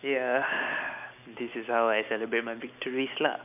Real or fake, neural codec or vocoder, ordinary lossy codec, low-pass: real; none; none; 3.6 kHz